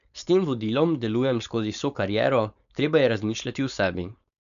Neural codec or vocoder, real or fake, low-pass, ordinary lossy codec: codec, 16 kHz, 4.8 kbps, FACodec; fake; 7.2 kHz; none